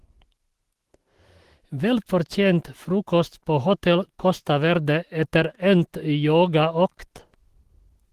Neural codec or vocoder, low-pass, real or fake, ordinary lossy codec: autoencoder, 48 kHz, 128 numbers a frame, DAC-VAE, trained on Japanese speech; 14.4 kHz; fake; Opus, 16 kbps